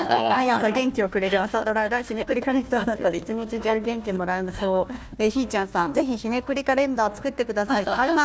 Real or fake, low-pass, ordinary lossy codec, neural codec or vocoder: fake; none; none; codec, 16 kHz, 1 kbps, FunCodec, trained on Chinese and English, 50 frames a second